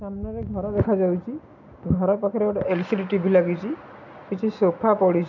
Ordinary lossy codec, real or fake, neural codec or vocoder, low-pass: none; real; none; 7.2 kHz